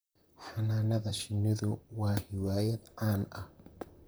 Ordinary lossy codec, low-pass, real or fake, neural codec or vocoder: none; none; fake; vocoder, 44.1 kHz, 128 mel bands, Pupu-Vocoder